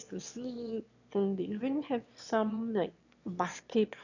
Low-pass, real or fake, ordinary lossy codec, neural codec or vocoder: 7.2 kHz; fake; none; autoencoder, 22.05 kHz, a latent of 192 numbers a frame, VITS, trained on one speaker